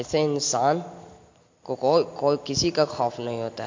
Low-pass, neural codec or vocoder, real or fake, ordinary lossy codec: 7.2 kHz; none; real; MP3, 48 kbps